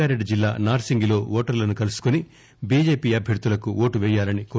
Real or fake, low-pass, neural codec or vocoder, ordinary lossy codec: real; none; none; none